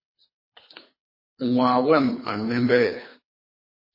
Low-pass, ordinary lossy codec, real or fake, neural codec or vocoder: 5.4 kHz; MP3, 24 kbps; fake; codec, 24 kHz, 3 kbps, HILCodec